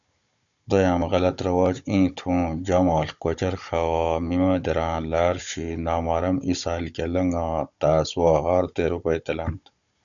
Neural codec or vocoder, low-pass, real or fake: codec, 16 kHz, 16 kbps, FunCodec, trained on Chinese and English, 50 frames a second; 7.2 kHz; fake